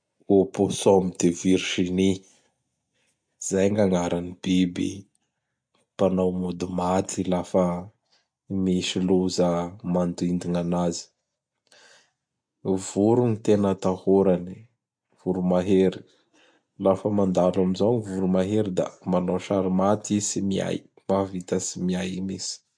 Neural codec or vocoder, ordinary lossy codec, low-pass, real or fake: none; MP3, 64 kbps; 9.9 kHz; real